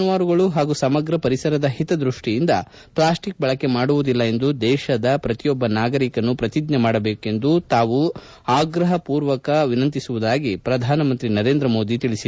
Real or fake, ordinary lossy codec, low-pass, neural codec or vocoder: real; none; none; none